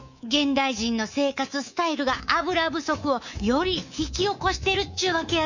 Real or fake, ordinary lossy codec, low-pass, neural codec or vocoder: fake; none; 7.2 kHz; codec, 24 kHz, 3.1 kbps, DualCodec